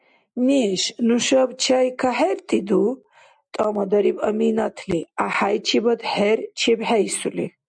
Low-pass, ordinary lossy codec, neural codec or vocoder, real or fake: 9.9 kHz; MP3, 96 kbps; none; real